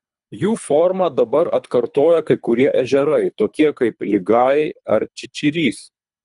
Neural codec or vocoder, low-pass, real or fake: codec, 24 kHz, 3 kbps, HILCodec; 10.8 kHz; fake